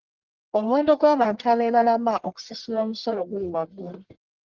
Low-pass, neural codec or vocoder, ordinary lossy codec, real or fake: 7.2 kHz; codec, 44.1 kHz, 1.7 kbps, Pupu-Codec; Opus, 16 kbps; fake